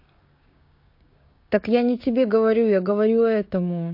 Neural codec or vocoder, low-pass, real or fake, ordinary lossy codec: codec, 16 kHz, 6 kbps, DAC; 5.4 kHz; fake; MP3, 32 kbps